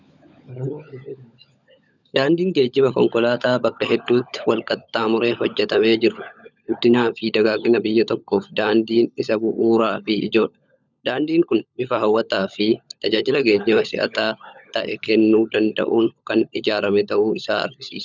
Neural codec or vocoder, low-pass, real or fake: codec, 16 kHz, 16 kbps, FunCodec, trained on LibriTTS, 50 frames a second; 7.2 kHz; fake